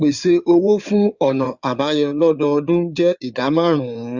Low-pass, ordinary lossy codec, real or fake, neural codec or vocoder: 7.2 kHz; Opus, 64 kbps; fake; codec, 16 kHz in and 24 kHz out, 2.2 kbps, FireRedTTS-2 codec